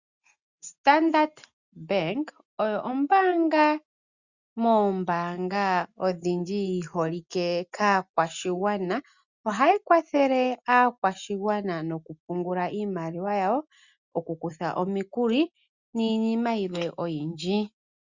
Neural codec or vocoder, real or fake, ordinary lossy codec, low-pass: none; real; Opus, 64 kbps; 7.2 kHz